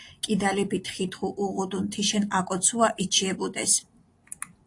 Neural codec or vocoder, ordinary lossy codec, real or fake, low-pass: none; AAC, 48 kbps; real; 10.8 kHz